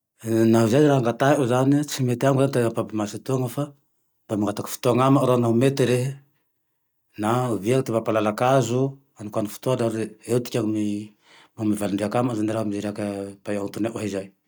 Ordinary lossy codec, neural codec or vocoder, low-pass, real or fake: none; none; none; real